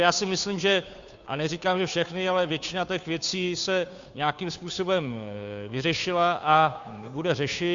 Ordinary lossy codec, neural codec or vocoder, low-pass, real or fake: MP3, 64 kbps; codec, 16 kHz, 2 kbps, FunCodec, trained on Chinese and English, 25 frames a second; 7.2 kHz; fake